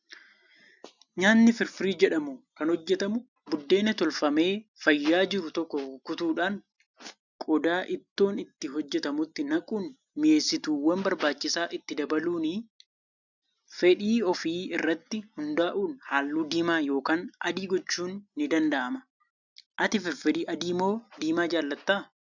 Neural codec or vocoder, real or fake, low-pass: none; real; 7.2 kHz